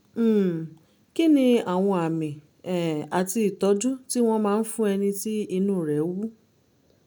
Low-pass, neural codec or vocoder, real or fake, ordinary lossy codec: none; none; real; none